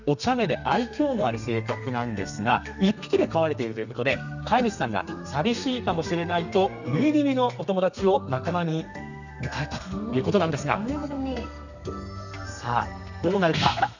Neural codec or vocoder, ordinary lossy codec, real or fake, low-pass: codec, 32 kHz, 1.9 kbps, SNAC; none; fake; 7.2 kHz